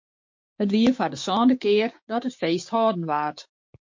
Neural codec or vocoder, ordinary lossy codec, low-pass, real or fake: codec, 16 kHz, 6 kbps, DAC; MP3, 48 kbps; 7.2 kHz; fake